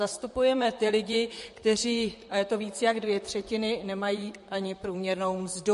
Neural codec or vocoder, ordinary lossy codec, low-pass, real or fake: vocoder, 44.1 kHz, 128 mel bands, Pupu-Vocoder; MP3, 48 kbps; 14.4 kHz; fake